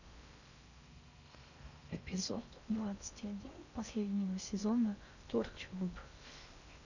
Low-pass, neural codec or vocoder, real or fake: 7.2 kHz; codec, 16 kHz in and 24 kHz out, 0.6 kbps, FocalCodec, streaming, 4096 codes; fake